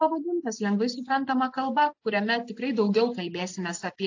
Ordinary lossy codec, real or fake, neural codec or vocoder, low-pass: AAC, 48 kbps; real; none; 7.2 kHz